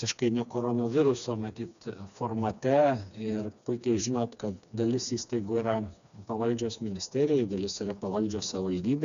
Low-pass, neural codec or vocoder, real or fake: 7.2 kHz; codec, 16 kHz, 2 kbps, FreqCodec, smaller model; fake